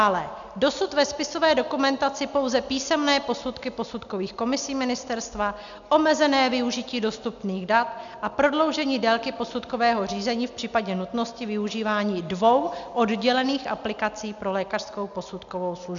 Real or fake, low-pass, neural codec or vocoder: real; 7.2 kHz; none